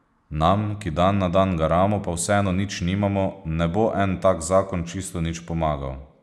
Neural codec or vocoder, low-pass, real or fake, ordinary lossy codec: none; none; real; none